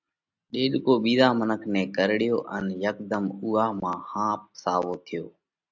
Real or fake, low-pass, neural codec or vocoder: real; 7.2 kHz; none